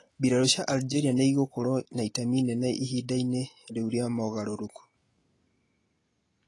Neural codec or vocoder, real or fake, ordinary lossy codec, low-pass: none; real; AAC, 32 kbps; 10.8 kHz